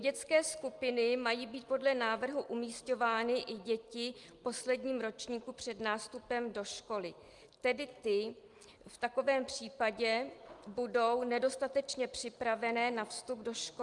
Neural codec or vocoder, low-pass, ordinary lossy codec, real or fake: none; 10.8 kHz; Opus, 32 kbps; real